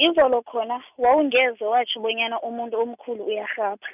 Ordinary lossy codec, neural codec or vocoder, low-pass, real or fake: none; none; 3.6 kHz; real